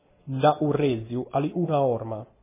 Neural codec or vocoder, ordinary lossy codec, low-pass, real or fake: none; MP3, 16 kbps; 3.6 kHz; real